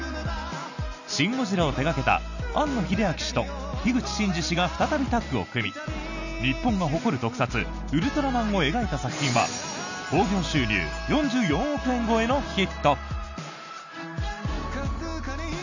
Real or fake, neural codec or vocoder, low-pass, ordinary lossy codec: real; none; 7.2 kHz; none